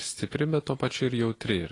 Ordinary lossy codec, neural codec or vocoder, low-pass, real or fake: AAC, 32 kbps; none; 10.8 kHz; real